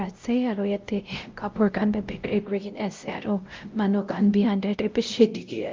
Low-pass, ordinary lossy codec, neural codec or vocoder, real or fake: 7.2 kHz; Opus, 32 kbps; codec, 16 kHz, 0.5 kbps, X-Codec, WavLM features, trained on Multilingual LibriSpeech; fake